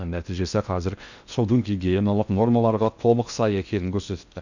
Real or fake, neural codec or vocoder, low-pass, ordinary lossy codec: fake; codec, 16 kHz in and 24 kHz out, 0.6 kbps, FocalCodec, streaming, 2048 codes; 7.2 kHz; none